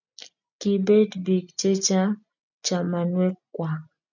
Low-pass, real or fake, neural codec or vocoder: 7.2 kHz; real; none